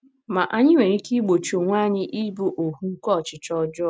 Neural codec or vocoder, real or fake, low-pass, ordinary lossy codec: none; real; none; none